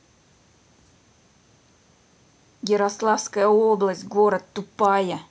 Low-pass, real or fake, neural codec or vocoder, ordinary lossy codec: none; real; none; none